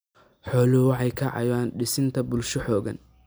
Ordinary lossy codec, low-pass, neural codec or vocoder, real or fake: none; none; none; real